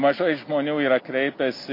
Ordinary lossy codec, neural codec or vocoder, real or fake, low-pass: MP3, 32 kbps; none; real; 5.4 kHz